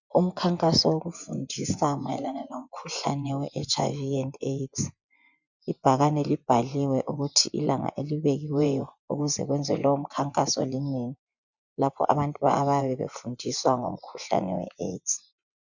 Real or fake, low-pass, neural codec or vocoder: fake; 7.2 kHz; vocoder, 24 kHz, 100 mel bands, Vocos